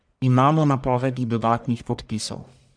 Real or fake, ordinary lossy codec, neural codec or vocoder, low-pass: fake; none; codec, 44.1 kHz, 1.7 kbps, Pupu-Codec; 9.9 kHz